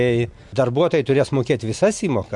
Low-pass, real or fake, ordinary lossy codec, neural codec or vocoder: 10.8 kHz; real; MP3, 64 kbps; none